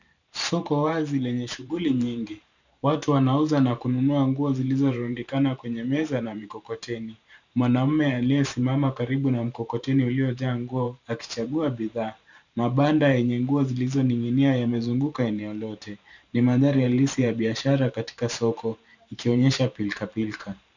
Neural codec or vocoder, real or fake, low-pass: vocoder, 44.1 kHz, 128 mel bands every 512 samples, BigVGAN v2; fake; 7.2 kHz